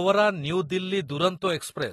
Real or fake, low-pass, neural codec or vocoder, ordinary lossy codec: real; 19.8 kHz; none; AAC, 32 kbps